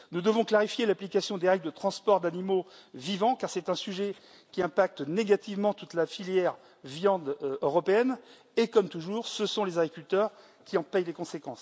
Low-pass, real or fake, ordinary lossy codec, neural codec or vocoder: none; real; none; none